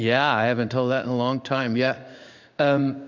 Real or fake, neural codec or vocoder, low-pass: fake; codec, 16 kHz in and 24 kHz out, 1 kbps, XY-Tokenizer; 7.2 kHz